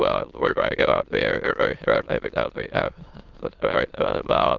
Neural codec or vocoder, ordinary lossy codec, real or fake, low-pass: autoencoder, 22.05 kHz, a latent of 192 numbers a frame, VITS, trained on many speakers; Opus, 32 kbps; fake; 7.2 kHz